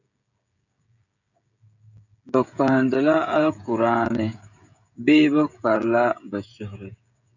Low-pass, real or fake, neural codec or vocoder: 7.2 kHz; fake; codec, 16 kHz, 16 kbps, FreqCodec, smaller model